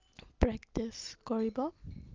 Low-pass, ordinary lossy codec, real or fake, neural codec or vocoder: 7.2 kHz; Opus, 24 kbps; real; none